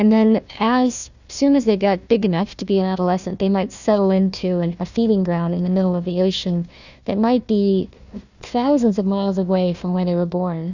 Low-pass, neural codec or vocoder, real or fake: 7.2 kHz; codec, 16 kHz, 1 kbps, FunCodec, trained on Chinese and English, 50 frames a second; fake